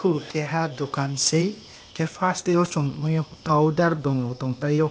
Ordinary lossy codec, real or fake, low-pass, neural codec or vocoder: none; fake; none; codec, 16 kHz, 0.8 kbps, ZipCodec